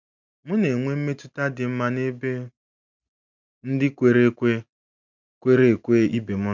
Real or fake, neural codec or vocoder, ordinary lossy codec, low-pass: real; none; none; 7.2 kHz